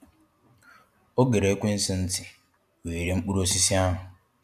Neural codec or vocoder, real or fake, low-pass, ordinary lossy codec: none; real; 14.4 kHz; none